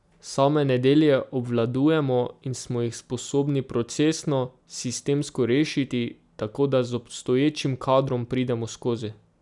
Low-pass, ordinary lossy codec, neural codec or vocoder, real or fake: 10.8 kHz; none; none; real